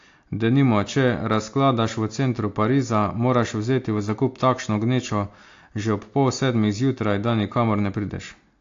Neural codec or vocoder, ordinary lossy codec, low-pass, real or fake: none; AAC, 48 kbps; 7.2 kHz; real